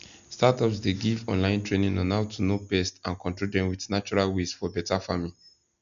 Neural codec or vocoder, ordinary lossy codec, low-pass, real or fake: none; none; 7.2 kHz; real